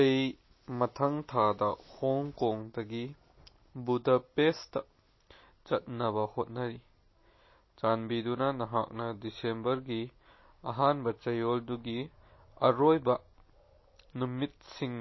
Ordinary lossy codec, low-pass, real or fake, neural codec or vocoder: MP3, 24 kbps; 7.2 kHz; real; none